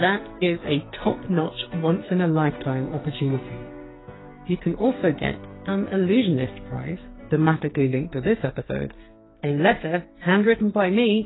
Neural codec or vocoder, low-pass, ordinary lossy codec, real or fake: codec, 44.1 kHz, 2.6 kbps, SNAC; 7.2 kHz; AAC, 16 kbps; fake